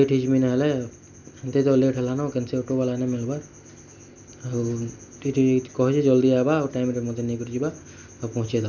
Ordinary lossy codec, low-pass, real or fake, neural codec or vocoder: none; 7.2 kHz; real; none